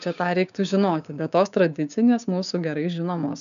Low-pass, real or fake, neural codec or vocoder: 7.2 kHz; fake; codec, 16 kHz, 6 kbps, DAC